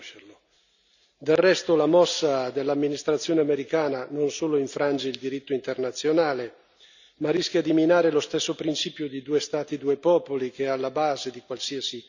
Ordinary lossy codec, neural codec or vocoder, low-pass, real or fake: none; none; 7.2 kHz; real